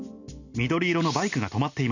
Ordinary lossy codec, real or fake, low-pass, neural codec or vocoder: none; real; 7.2 kHz; none